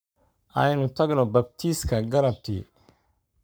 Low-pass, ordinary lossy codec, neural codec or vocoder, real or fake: none; none; codec, 44.1 kHz, 7.8 kbps, Pupu-Codec; fake